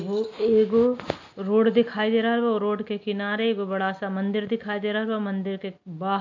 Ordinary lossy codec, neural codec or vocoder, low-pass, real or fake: MP3, 48 kbps; none; 7.2 kHz; real